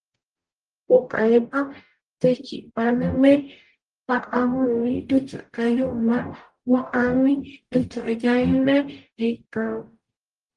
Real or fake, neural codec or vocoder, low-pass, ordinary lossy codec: fake; codec, 44.1 kHz, 0.9 kbps, DAC; 10.8 kHz; Opus, 24 kbps